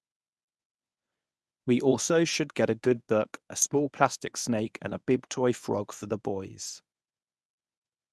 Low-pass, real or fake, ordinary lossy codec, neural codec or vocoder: none; fake; none; codec, 24 kHz, 0.9 kbps, WavTokenizer, medium speech release version 1